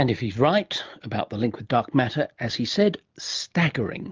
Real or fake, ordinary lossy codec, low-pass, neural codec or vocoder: real; Opus, 24 kbps; 7.2 kHz; none